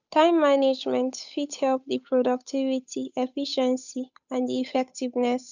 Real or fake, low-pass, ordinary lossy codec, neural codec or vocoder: fake; 7.2 kHz; none; codec, 16 kHz, 8 kbps, FunCodec, trained on Chinese and English, 25 frames a second